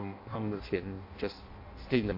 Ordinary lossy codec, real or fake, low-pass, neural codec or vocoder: MP3, 32 kbps; fake; 5.4 kHz; codec, 16 kHz in and 24 kHz out, 0.6 kbps, FireRedTTS-2 codec